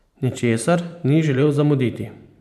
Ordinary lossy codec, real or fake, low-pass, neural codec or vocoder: none; fake; 14.4 kHz; vocoder, 44.1 kHz, 128 mel bands every 512 samples, BigVGAN v2